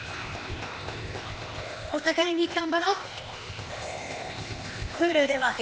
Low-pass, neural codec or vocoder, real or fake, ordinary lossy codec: none; codec, 16 kHz, 0.8 kbps, ZipCodec; fake; none